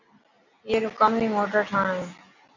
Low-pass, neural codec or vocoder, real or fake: 7.2 kHz; none; real